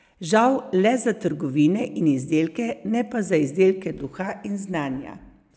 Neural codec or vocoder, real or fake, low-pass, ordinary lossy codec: none; real; none; none